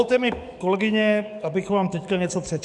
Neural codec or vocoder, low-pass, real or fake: codec, 44.1 kHz, 7.8 kbps, DAC; 10.8 kHz; fake